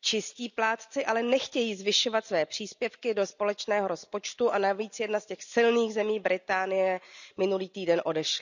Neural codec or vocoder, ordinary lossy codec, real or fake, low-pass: none; none; real; 7.2 kHz